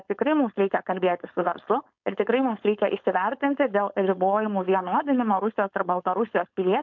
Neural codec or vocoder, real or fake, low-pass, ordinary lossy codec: codec, 16 kHz, 4.8 kbps, FACodec; fake; 7.2 kHz; MP3, 64 kbps